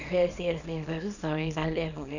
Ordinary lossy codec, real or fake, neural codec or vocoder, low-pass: none; fake; codec, 24 kHz, 0.9 kbps, WavTokenizer, small release; 7.2 kHz